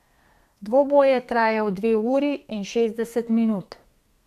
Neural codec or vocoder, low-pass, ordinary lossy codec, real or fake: codec, 32 kHz, 1.9 kbps, SNAC; 14.4 kHz; none; fake